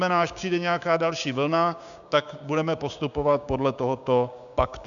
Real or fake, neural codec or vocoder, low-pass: fake; codec, 16 kHz, 6 kbps, DAC; 7.2 kHz